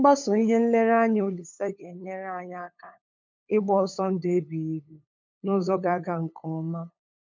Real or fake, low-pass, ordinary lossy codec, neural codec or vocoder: fake; 7.2 kHz; MP3, 64 kbps; codec, 16 kHz, 8 kbps, FunCodec, trained on LibriTTS, 25 frames a second